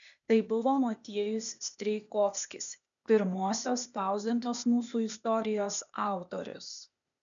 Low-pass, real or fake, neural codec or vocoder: 7.2 kHz; fake; codec, 16 kHz, 0.8 kbps, ZipCodec